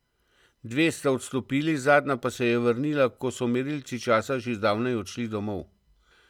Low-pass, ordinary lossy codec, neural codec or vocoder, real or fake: 19.8 kHz; none; none; real